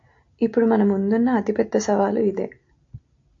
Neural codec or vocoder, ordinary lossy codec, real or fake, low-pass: none; AAC, 48 kbps; real; 7.2 kHz